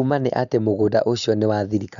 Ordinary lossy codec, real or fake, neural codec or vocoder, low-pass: none; real; none; 7.2 kHz